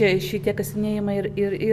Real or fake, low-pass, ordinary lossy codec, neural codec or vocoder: real; 14.4 kHz; Opus, 64 kbps; none